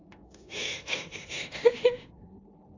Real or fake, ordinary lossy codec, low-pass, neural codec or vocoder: fake; AAC, 32 kbps; 7.2 kHz; codec, 16 kHz in and 24 kHz out, 0.9 kbps, LongCat-Audio-Codec, four codebook decoder